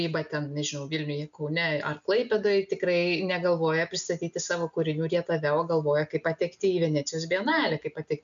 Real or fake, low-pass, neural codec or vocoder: real; 7.2 kHz; none